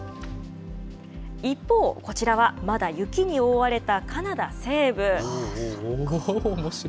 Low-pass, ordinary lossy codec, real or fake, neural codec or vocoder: none; none; real; none